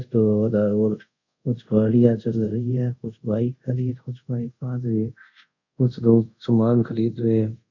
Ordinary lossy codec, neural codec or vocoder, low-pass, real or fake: none; codec, 24 kHz, 0.5 kbps, DualCodec; 7.2 kHz; fake